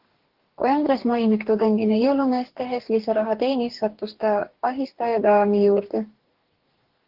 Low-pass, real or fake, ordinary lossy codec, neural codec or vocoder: 5.4 kHz; fake; Opus, 16 kbps; codec, 44.1 kHz, 2.6 kbps, DAC